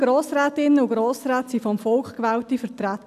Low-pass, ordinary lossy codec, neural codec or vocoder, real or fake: 14.4 kHz; none; none; real